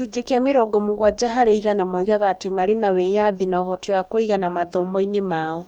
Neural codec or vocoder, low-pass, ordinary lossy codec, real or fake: codec, 44.1 kHz, 2.6 kbps, DAC; 19.8 kHz; none; fake